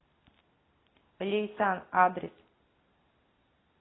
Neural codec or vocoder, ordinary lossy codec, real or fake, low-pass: none; AAC, 16 kbps; real; 7.2 kHz